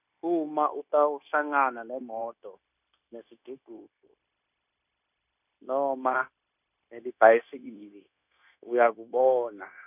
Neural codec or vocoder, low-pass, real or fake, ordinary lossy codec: codec, 16 kHz, 0.9 kbps, LongCat-Audio-Codec; 3.6 kHz; fake; none